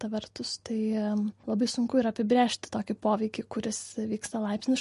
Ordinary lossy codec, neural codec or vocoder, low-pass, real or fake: MP3, 48 kbps; none; 14.4 kHz; real